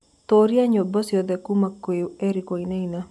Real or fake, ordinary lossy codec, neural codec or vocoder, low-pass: real; none; none; none